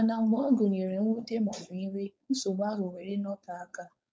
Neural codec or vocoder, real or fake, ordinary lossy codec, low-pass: codec, 16 kHz, 4.8 kbps, FACodec; fake; none; none